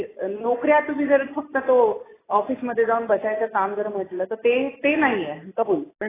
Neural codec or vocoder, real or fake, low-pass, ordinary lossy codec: none; real; 3.6 kHz; AAC, 16 kbps